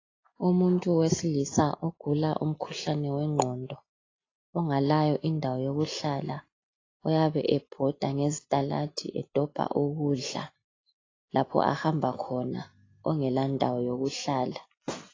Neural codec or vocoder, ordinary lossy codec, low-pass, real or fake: none; AAC, 32 kbps; 7.2 kHz; real